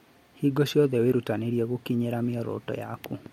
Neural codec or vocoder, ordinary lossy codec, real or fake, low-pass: none; MP3, 64 kbps; real; 19.8 kHz